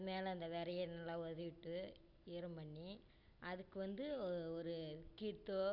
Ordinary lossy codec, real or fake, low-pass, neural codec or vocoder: none; real; 5.4 kHz; none